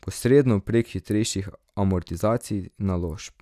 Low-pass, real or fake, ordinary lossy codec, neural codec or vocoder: 14.4 kHz; real; none; none